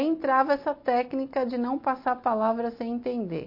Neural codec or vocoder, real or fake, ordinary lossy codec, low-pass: none; real; MP3, 32 kbps; 5.4 kHz